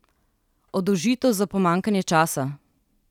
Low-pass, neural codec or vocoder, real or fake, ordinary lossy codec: 19.8 kHz; none; real; none